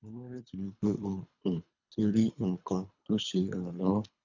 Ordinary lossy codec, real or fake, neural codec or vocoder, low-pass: none; fake; codec, 24 kHz, 3 kbps, HILCodec; 7.2 kHz